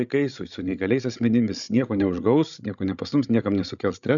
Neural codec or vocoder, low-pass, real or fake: codec, 16 kHz, 16 kbps, FreqCodec, larger model; 7.2 kHz; fake